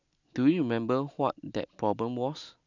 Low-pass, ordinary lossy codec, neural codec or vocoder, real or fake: 7.2 kHz; none; none; real